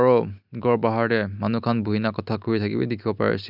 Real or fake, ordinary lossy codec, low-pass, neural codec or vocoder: real; none; 5.4 kHz; none